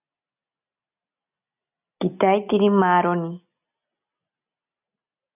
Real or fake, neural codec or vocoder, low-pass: real; none; 3.6 kHz